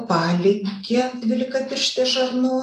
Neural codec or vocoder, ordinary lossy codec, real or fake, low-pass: none; AAC, 64 kbps; real; 14.4 kHz